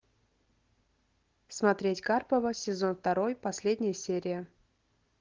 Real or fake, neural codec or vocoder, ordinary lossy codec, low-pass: real; none; Opus, 16 kbps; 7.2 kHz